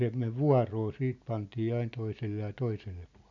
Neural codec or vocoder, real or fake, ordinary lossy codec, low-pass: none; real; none; 7.2 kHz